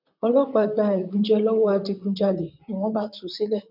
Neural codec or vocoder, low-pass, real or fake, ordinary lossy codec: vocoder, 44.1 kHz, 128 mel bands, Pupu-Vocoder; 5.4 kHz; fake; none